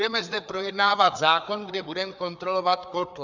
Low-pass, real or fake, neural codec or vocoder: 7.2 kHz; fake; codec, 16 kHz, 4 kbps, FreqCodec, larger model